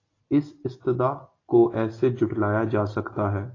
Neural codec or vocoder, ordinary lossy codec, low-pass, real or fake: none; AAC, 32 kbps; 7.2 kHz; real